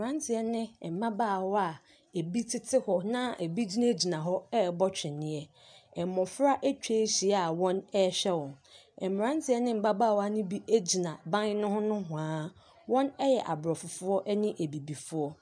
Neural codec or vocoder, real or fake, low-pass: none; real; 9.9 kHz